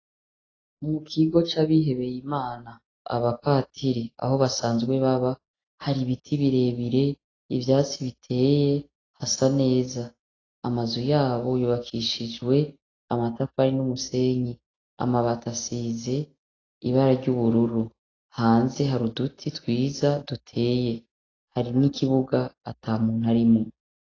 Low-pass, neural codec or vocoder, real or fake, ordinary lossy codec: 7.2 kHz; none; real; AAC, 32 kbps